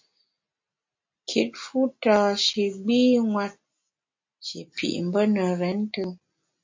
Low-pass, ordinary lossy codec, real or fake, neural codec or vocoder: 7.2 kHz; MP3, 48 kbps; real; none